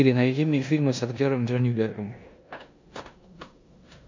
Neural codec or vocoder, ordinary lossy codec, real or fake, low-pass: codec, 16 kHz in and 24 kHz out, 0.9 kbps, LongCat-Audio-Codec, four codebook decoder; MP3, 64 kbps; fake; 7.2 kHz